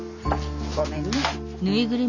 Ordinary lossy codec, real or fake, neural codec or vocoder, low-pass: none; real; none; 7.2 kHz